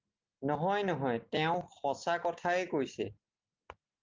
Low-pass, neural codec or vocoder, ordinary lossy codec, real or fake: 7.2 kHz; none; Opus, 32 kbps; real